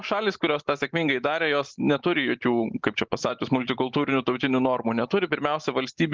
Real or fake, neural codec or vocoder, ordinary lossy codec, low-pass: real; none; Opus, 32 kbps; 7.2 kHz